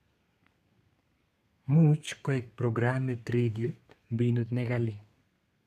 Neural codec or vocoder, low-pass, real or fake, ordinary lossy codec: codec, 32 kHz, 1.9 kbps, SNAC; 14.4 kHz; fake; none